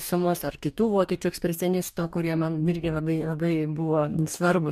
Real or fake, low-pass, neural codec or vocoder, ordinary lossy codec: fake; 14.4 kHz; codec, 44.1 kHz, 2.6 kbps, DAC; MP3, 96 kbps